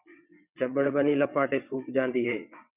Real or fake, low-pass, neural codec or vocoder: fake; 3.6 kHz; vocoder, 22.05 kHz, 80 mel bands, WaveNeXt